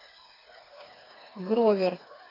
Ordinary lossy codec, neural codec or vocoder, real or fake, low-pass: AAC, 24 kbps; codec, 16 kHz, 4 kbps, FreqCodec, smaller model; fake; 5.4 kHz